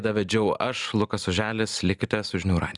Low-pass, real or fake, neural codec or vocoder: 10.8 kHz; real; none